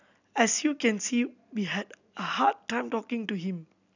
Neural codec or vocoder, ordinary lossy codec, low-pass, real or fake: none; none; 7.2 kHz; real